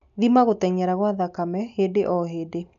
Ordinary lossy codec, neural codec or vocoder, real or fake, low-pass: none; none; real; 7.2 kHz